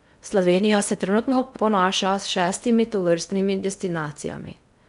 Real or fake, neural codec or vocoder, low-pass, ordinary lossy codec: fake; codec, 16 kHz in and 24 kHz out, 0.6 kbps, FocalCodec, streaming, 4096 codes; 10.8 kHz; MP3, 96 kbps